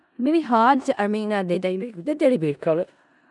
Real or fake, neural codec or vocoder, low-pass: fake; codec, 16 kHz in and 24 kHz out, 0.4 kbps, LongCat-Audio-Codec, four codebook decoder; 10.8 kHz